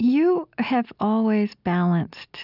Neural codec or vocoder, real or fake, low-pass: none; real; 5.4 kHz